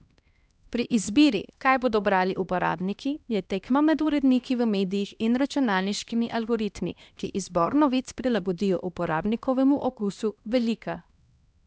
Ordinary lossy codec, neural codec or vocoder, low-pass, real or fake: none; codec, 16 kHz, 1 kbps, X-Codec, HuBERT features, trained on LibriSpeech; none; fake